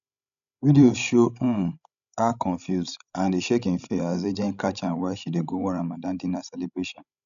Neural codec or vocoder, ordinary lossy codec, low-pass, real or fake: codec, 16 kHz, 16 kbps, FreqCodec, larger model; none; 7.2 kHz; fake